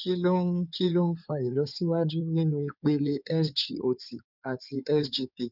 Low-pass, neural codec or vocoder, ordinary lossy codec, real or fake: 5.4 kHz; codec, 16 kHz in and 24 kHz out, 2.2 kbps, FireRedTTS-2 codec; none; fake